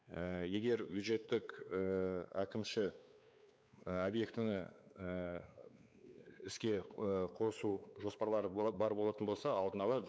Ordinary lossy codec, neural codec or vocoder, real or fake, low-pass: none; codec, 16 kHz, 4 kbps, X-Codec, WavLM features, trained on Multilingual LibriSpeech; fake; none